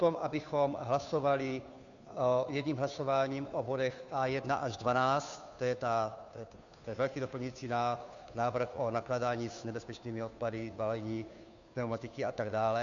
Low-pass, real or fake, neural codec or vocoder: 7.2 kHz; fake; codec, 16 kHz, 2 kbps, FunCodec, trained on Chinese and English, 25 frames a second